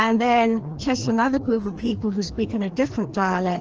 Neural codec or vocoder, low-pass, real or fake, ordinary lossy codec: codec, 16 kHz, 2 kbps, FreqCodec, larger model; 7.2 kHz; fake; Opus, 16 kbps